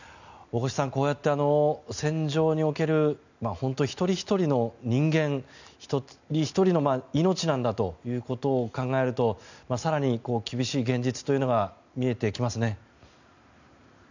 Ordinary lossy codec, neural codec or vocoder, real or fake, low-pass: none; none; real; 7.2 kHz